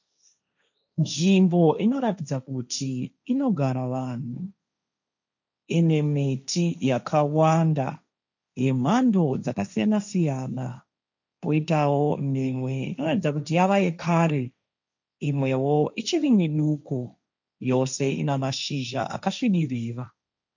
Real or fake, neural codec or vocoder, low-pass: fake; codec, 16 kHz, 1.1 kbps, Voila-Tokenizer; 7.2 kHz